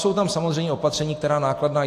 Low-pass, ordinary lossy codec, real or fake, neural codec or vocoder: 14.4 kHz; AAC, 64 kbps; real; none